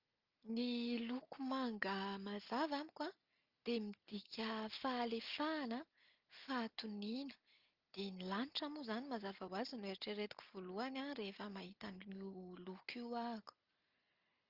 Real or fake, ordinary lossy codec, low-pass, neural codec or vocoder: real; Opus, 32 kbps; 5.4 kHz; none